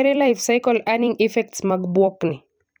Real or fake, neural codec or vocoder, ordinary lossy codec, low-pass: fake; vocoder, 44.1 kHz, 128 mel bands every 256 samples, BigVGAN v2; none; none